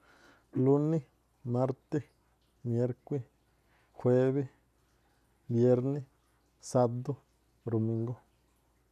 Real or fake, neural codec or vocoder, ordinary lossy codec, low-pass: real; none; none; 14.4 kHz